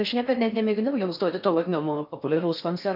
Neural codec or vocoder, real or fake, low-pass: codec, 16 kHz in and 24 kHz out, 0.6 kbps, FocalCodec, streaming, 4096 codes; fake; 5.4 kHz